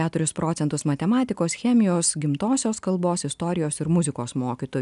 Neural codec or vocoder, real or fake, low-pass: none; real; 10.8 kHz